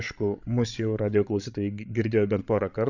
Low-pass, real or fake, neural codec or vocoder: 7.2 kHz; fake; codec, 16 kHz, 16 kbps, FreqCodec, larger model